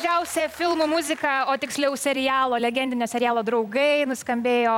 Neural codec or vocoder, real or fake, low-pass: vocoder, 44.1 kHz, 128 mel bands every 256 samples, BigVGAN v2; fake; 19.8 kHz